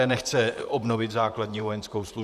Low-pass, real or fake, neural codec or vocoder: 14.4 kHz; fake; vocoder, 44.1 kHz, 128 mel bands, Pupu-Vocoder